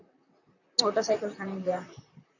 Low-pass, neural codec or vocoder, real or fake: 7.2 kHz; vocoder, 44.1 kHz, 128 mel bands, Pupu-Vocoder; fake